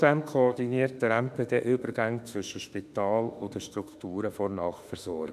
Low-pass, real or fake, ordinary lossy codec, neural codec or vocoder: 14.4 kHz; fake; none; autoencoder, 48 kHz, 32 numbers a frame, DAC-VAE, trained on Japanese speech